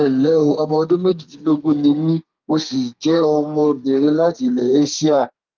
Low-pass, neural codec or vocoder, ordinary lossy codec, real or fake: 7.2 kHz; codec, 32 kHz, 1.9 kbps, SNAC; Opus, 24 kbps; fake